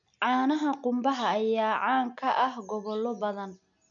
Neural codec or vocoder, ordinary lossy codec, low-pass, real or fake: none; none; 7.2 kHz; real